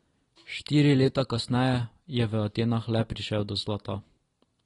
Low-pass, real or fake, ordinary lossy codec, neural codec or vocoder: 10.8 kHz; real; AAC, 32 kbps; none